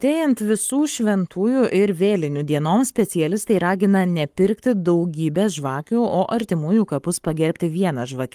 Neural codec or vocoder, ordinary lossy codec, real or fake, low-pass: codec, 44.1 kHz, 7.8 kbps, Pupu-Codec; Opus, 32 kbps; fake; 14.4 kHz